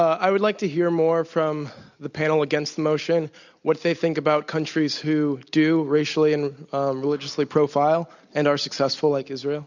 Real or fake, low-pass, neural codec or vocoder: real; 7.2 kHz; none